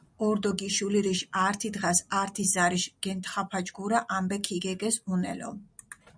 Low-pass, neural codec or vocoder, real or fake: 9.9 kHz; none; real